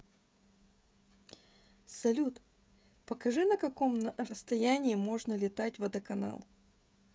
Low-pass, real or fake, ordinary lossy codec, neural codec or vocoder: none; fake; none; codec, 16 kHz, 16 kbps, FreqCodec, smaller model